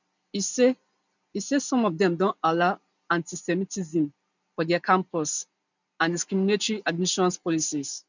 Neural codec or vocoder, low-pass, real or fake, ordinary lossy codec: none; 7.2 kHz; real; none